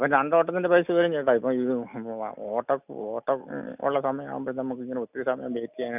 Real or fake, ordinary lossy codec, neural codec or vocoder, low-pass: real; none; none; 3.6 kHz